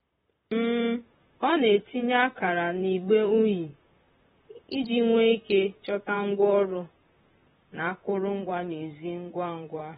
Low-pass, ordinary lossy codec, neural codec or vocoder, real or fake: 19.8 kHz; AAC, 16 kbps; vocoder, 44.1 kHz, 128 mel bands, Pupu-Vocoder; fake